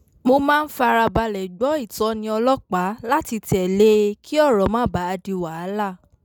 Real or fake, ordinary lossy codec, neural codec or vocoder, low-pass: real; none; none; none